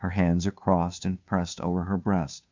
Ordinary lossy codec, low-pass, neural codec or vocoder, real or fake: MP3, 64 kbps; 7.2 kHz; codec, 16 kHz, 2 kbps, FunCodec, trained on Chinese and English, 25 frames a second; fake